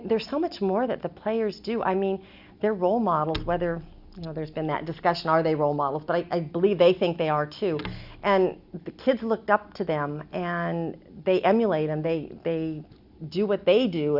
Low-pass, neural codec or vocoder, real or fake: 5.4 kHz; none; real